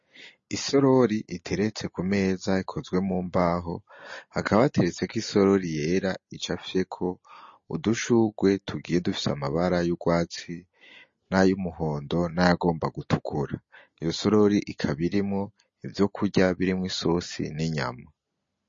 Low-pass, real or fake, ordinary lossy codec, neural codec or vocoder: 7.2 kHz; real; MP3, 32 kbps; none